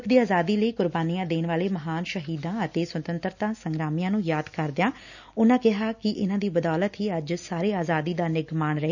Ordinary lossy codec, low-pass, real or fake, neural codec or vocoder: none; 7.2 kHz; real; none